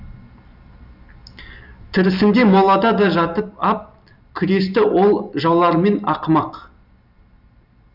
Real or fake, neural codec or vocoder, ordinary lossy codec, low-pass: real; none; none; 5.4 kHz